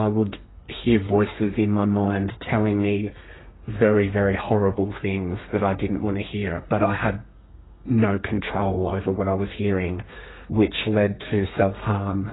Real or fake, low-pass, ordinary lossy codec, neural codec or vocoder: fake; 7.2 kHz; AAC, 16 kbps; codec, 32 kHz, 1.9 kbps, SNAC